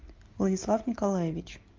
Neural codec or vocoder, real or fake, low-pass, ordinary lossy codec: none; real; 7.2 kHz; Opus, 32 kbps